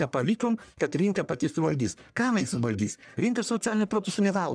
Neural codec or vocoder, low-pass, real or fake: codec, 44.1 kHz, 1.7 kbps, Pupu-Codec; 9.9 kHz; fake